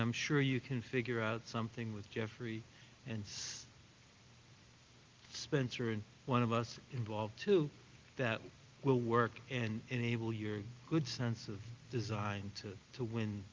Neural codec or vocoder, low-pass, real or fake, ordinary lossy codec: none; 7.2 kHz; real; Opus, 32 kbps